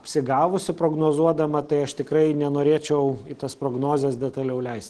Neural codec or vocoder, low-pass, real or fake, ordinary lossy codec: none; 9.9 kHz; real; Opus, 16 kbps